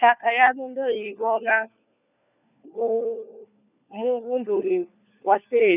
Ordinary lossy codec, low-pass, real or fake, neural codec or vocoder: none; 3.6 kHz; fake; codec, 16 kHz, 4 kbps, FunCodec, trained on LibriTTS, 50 frames a second